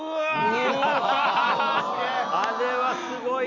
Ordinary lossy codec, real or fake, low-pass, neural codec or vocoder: none; real; 7.2 kHz; none